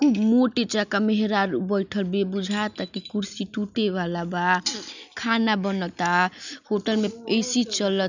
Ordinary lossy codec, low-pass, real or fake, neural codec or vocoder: none; 7.2 kHz; real; none